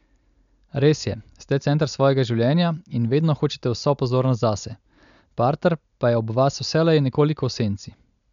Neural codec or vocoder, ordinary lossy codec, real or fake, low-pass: none; none; real; 7.2 kHz